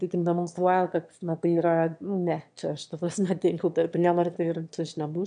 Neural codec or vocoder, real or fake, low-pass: autoencoder, 22.05 kHz, a latent of 192 numbers a frame, VITS, trained on one speaker; fake; 9.9 kHz